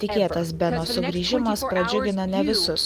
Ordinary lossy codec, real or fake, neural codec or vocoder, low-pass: Opus, 32 kbps; real; none; 14.4 kHz